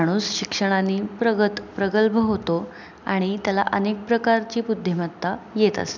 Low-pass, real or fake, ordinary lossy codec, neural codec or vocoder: 7.2 kHz; real; none; none